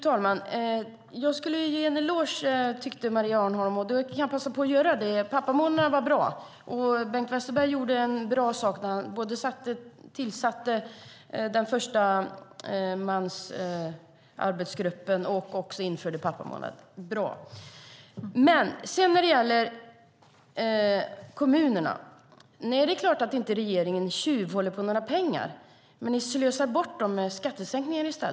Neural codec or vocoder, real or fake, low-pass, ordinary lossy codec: none; real; none; none